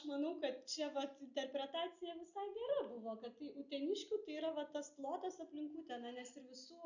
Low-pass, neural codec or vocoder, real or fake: 7.2 kHz; none; real